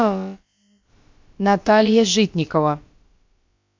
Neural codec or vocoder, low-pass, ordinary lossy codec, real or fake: codec, 16 kHz, about 1 kbps, DyCAST, with the encoder's durations; 7.2 kHz; MP3, 48 kbps; fake